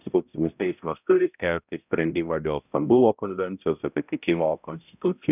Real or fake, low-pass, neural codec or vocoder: fake; 3.6 kHz; codec, 16 kHz, 0.5 kbps, X-Codec, HuBERT features, trained on balanced general audio